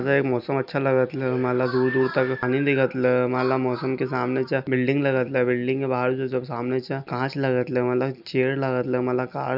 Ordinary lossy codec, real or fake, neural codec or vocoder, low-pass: none; real; none; 5.4 kHz